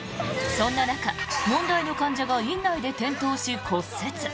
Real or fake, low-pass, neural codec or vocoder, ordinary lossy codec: real; none; none; none